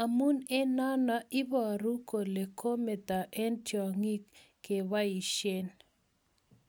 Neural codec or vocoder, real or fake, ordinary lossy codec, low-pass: none; real; none; none